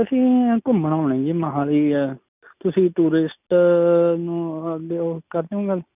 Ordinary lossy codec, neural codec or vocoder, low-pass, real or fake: none; none; 3.6 kHz; real